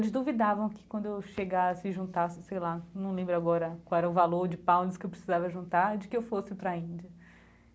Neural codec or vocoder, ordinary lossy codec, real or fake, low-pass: none; none; real; none